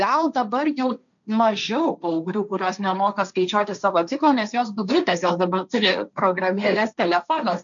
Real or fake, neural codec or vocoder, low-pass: fake; codec, 16 kHz, 1.1 kbps, Voila-Tokenizer; 7.2 kHz